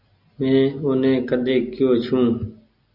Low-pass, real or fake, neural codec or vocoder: 5.4 kHz; real; none